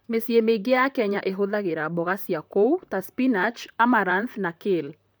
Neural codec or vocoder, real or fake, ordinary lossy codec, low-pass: vocoder, 44.1 kHz, 128 mel bands, Pupu-Vocoder; fake; none; none